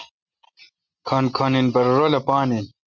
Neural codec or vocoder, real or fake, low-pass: none; real; 7.2 kHz